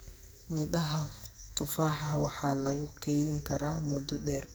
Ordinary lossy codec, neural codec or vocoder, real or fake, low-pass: none; codec, 44.1 kHz, 2.6 kbps, SNAC; fake; none